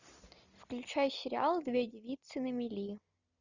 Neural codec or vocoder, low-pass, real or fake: none; 7.2 kHz; real